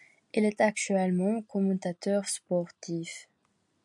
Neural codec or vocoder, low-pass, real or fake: none; 10.8 kHz; real